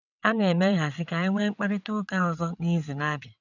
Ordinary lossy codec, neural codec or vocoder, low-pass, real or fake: none; vocoder, 22.05 kHz, 80 mel bands, WaveNeXt; 7.2 kHz; fake